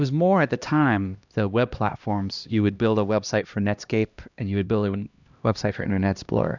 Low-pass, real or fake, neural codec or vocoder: 7.2 kHz; fake; codec, 16 kHz, 1 kbps, X-Codec, HuBERT features, trained on LibriSpeech